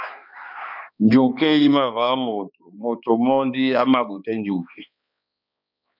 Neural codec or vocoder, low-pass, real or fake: codec, 16 kHz, 2 kbps, X-Codec, HuBERT features, trained on balanced general audio; 5.4 kHz; fake